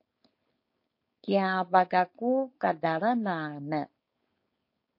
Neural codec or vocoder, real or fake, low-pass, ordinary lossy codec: codec, 16 kHz, 4.8 kbps, FACodec; fake; 5.4 kHz; MP3, 32 kbps